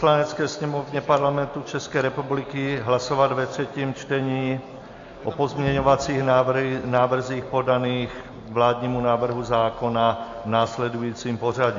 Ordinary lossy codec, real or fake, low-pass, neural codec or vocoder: AAC, 48 kbps; real; 7.2 kHz; none